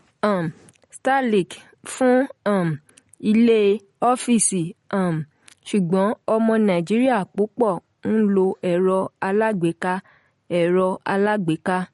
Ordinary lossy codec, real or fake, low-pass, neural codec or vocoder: MP3, 48 kbps; real; 19.8 kHz; none